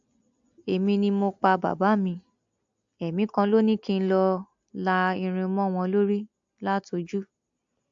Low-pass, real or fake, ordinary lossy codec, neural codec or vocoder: 7.2 kHz; real; AAC, 64 kbps; none